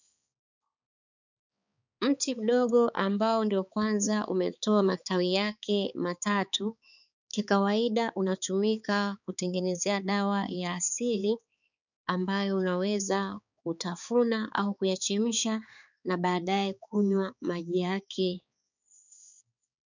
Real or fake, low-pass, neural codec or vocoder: fake; 7.2 kHz; codec, 16 kHz, 4 kbps, X-Codec, HuBERT features, trained on balanced general audio